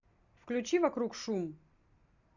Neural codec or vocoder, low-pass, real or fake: none; 7.2 kHz; real